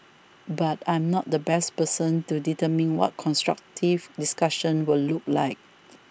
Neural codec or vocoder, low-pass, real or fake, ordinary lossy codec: none; none; real; none